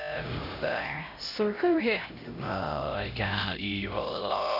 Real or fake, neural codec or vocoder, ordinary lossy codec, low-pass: fake; codec, 16 kHz, 0.5 kbps, X-Codec, HuBERT features, trained on LibriSpeech; none; 5.4 kHz